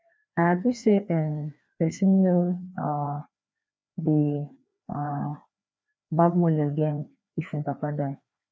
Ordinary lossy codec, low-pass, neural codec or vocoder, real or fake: none; none; codec, 16 kHz, 2 kbps, FreqCodec, larger model; fake